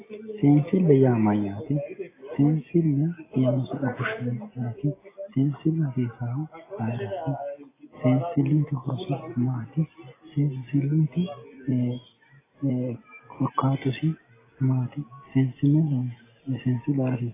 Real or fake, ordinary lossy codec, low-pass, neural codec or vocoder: real; AAC, 16 kbps; 3.6 kHz; none